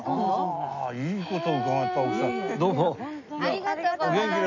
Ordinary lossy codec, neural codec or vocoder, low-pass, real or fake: none; none; 7.2 kHz; real